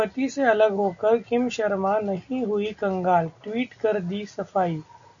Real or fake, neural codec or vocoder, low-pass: real; none; 7.2 kHz